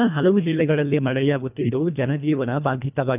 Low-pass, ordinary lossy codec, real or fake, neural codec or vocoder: 3.6 kHz; none; fake; codec, 24 kHz, 1.5 kbps, HILCodec